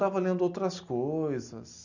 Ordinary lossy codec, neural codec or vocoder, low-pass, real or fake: none; none; 7.2 kHz; real